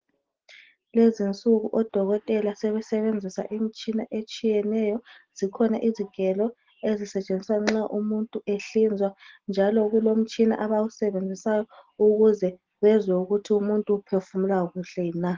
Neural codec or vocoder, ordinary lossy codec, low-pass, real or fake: none; Opus, 16 kbps; 7.2 kHz; real